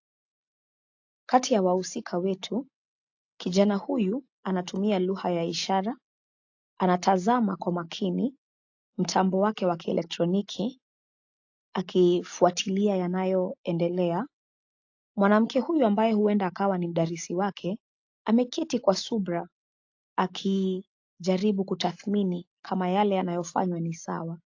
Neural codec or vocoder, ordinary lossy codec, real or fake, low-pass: none; AAC, 48 kbps; real; 7.2 kHz